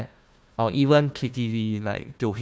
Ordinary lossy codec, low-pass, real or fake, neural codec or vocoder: none; none; fake; codec, 16 kHz, 1 kbps, FunCodec, trained on Chinese and English, 50 frames a second